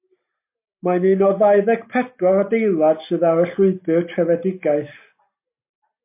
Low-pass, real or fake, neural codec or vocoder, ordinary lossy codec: 3.6 kHz; real; none; MP3, 24 kbps